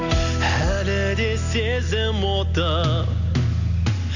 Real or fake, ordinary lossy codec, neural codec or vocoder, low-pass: real; none; none; 7.2 kHz